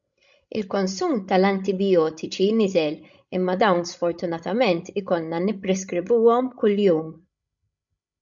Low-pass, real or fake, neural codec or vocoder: 7.2 kHz; fake; codec, 16 kHz, 16 kbps, FreqCodec, larger model